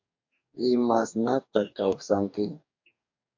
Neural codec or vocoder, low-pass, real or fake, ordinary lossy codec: codec, 44.1 kHz, 2.6 kbps, DAC; 7.2 kHz; fake; MP3, 64 kbps